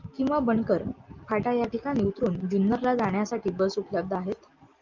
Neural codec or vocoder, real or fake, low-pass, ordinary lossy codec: none; real; 7.2 kHz; Opus, 24 kbps